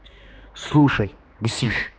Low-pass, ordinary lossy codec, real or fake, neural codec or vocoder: none; none; fake; codec, 16 kHz, 2 kbps, X-Codec, HuBERT features, trained on general audio